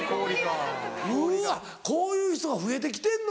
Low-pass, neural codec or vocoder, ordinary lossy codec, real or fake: none; none; none; real